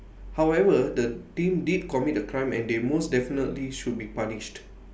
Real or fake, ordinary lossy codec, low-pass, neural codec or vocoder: real; none; none; none